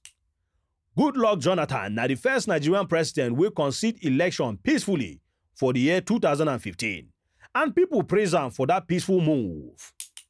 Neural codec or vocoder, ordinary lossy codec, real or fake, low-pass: none; none; real; none